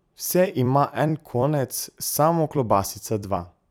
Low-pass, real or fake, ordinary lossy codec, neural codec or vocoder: none; fake; none; vocoder, 44.1 kHz, 128 mel bands every 256 samples, BigVGAN v2